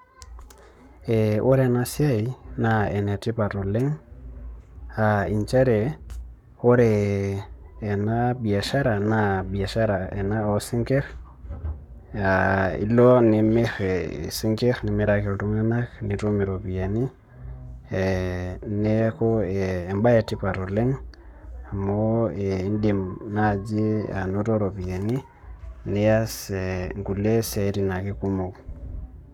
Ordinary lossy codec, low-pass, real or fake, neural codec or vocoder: none; 19.8 kHz; fake; codec, 44.1 kHz, 7.8 kbps, Pupu-Codec